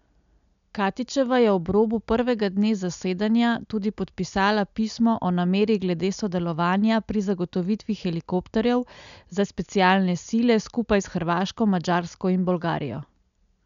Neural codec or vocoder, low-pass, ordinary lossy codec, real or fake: none; 7.2 kHz; MP3, 96 kbps; real